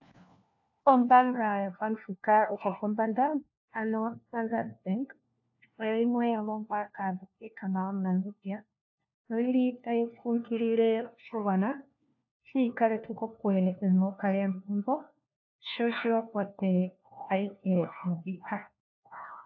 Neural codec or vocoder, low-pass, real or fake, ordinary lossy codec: codec, 16 kHz, 1 kbps, FunCodec, trained on LibriTTS, 50 frames a second; 7.2 kHz; fake; AAC, 48 kbps